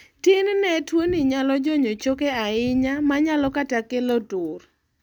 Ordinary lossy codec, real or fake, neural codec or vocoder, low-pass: none; real; none; 19.8 kHz